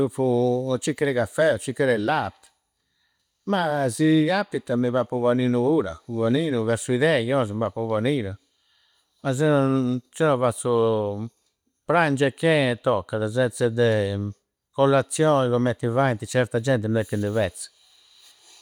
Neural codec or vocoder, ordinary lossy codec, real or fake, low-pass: none; none; real; 19.8 kHz